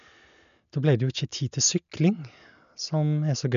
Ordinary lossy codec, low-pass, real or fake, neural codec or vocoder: none; 7.2 kHz; real; none